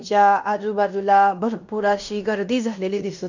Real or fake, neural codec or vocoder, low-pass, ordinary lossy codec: fake; codec, 16 kHz in and 24 kHz out, 0.9 kbps, LongCat-Audio-Codec, fine tuned four codebook decoder; 7.2 kHz; none